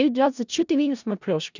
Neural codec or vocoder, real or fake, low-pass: codec, 16 kHz in and 24 kHz out, 0.4 kbps, LongCat-Audio-Codec, four codebook decoder; fake; 7.2 kHz